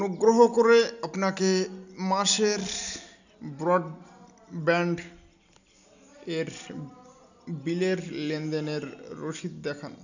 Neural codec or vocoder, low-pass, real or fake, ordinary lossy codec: none; 7.2 kHz; real; none